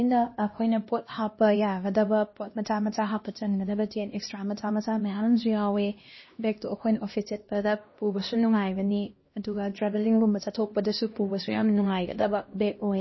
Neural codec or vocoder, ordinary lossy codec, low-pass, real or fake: codec, 16 kHz, 1 kbps, X-Codec, HuBERT features, trained on LibriSpeech; MP3, 24 kbps; 7.2 kHz; fake